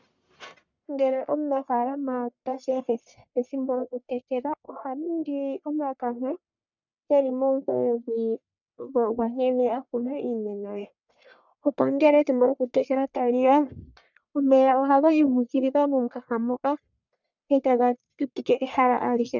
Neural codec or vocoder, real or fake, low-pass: codec, 44.1 kHz, 1.7 kbps, Pupu-Codec; fake; 7.2 kHz